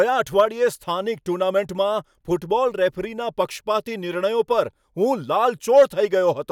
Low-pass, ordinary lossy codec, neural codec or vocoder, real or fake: 19.8 kHz; none; none; real